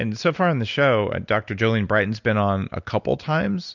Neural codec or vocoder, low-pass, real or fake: none; 7.2 kHz; real